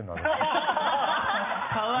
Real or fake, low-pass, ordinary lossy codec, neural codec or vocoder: real; 3.6 kHz; MP3, 24 kbps; none